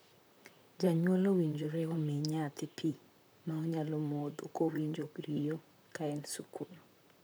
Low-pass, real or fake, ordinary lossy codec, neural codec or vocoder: none; fake; none; vocoder, 44.1 kHz, 128 mel bands, Pupu-Vocoder